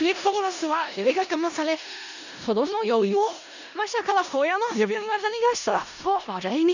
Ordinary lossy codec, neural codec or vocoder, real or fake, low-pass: none; codec, 16 kHz in and 24 kHz out, 0.4 kbps, LongCat-Audio-Codec, four codebook decoder; fake; 7.2 kHz